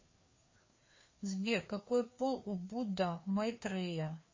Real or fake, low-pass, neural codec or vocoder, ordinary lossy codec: fake; 7.2 kHz; codec, 16 kHz, 2 kbps, FreqCodec, larger model; MP3, 32 kbps